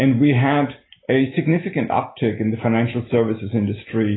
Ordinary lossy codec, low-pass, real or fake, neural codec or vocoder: AAC, 16 kbps; 7.2 kHz; real; none